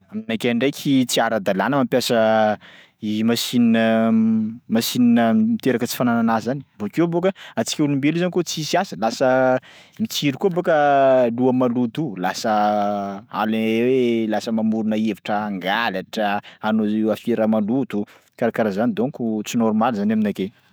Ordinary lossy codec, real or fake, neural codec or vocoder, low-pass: none; fake; autoencoder, 48 kHz, 128 numbers a frame, DAC-VAE, trained on Japanese speech; none